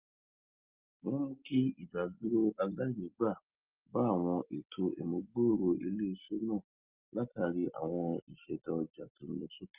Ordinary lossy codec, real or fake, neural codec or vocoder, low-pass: Opus, 32 kbps; real; none; 3.6 kHz